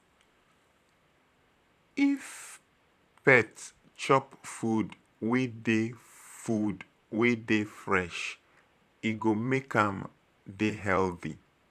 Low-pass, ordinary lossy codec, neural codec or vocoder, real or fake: 14.4 kHz; none; vocoder, 44.1 kHz, 128 mel bands, Pupu-Vocoder; fake